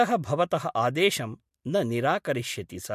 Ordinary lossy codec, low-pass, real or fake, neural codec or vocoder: MP3, 64 kbps; 14.4 kHz; real; none